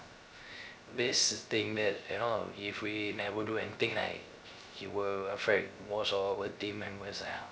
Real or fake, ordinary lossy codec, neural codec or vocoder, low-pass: fake; none; codec, 16 kHz, 0.3 kbps, FocalCodec; none